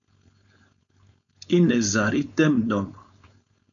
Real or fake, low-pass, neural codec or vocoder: fake; 7.2 kHz; codec, 16 kHz, 4.8 kbps, FACodec